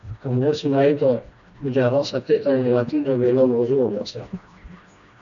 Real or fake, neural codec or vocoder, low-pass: fake; codec, 16 kHz, 1 kbps, FreqCodec, smaller model; 7.2 kHz